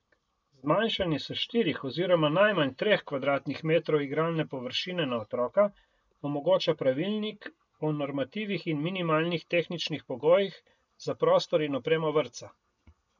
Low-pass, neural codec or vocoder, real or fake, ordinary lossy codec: 7.2 kHz; none; real; none